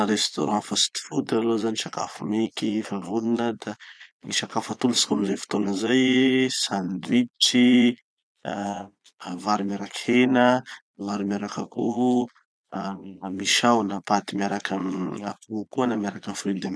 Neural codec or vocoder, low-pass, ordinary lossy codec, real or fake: vocoder, 22.05 kHz, 80 mel bands, Vocos; none; none; fake